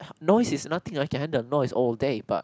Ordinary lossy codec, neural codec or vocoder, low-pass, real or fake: none; none; none; real